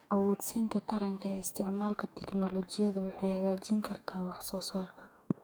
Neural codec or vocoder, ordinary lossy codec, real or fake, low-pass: codec, 44.1 kHz, 2.6 kbps, DAC; none; fake; none